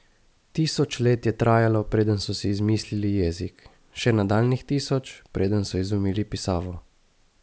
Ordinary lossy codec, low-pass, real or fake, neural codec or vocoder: none; none; real; none